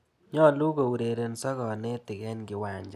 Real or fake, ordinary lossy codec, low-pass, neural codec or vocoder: real; none; 14.4 kHz; none